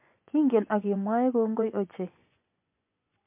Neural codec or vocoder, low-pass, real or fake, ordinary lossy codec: vocoder, 24 kHz, 100 mel bands, Vocos; 3.6 kHz; fake; MP3, 32 kbps